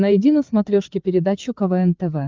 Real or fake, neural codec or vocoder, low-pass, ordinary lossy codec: fake; codec, 16 kHz, 8 kbps, FreqCodec, larger model; 7.2 kHz; Opus, 24 kbps